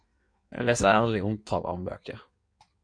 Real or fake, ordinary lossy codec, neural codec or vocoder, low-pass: fake; MP3, 64 kbps; codec, 16 kHz in and 24 kHz out, 1.1 kbps, FireRedTTS-2 codec; 9.9 kHz